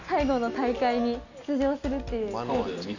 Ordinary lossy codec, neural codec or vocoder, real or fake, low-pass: none; none; real; 7.2 kHz